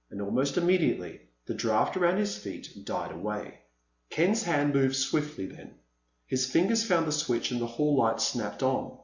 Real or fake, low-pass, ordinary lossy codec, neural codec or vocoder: real; 7.2 kHz; Opus, 64 kbps; none